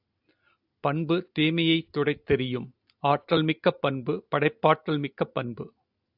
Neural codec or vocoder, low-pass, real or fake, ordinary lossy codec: none; 5.4 kHz; real; AAC, 48 kbps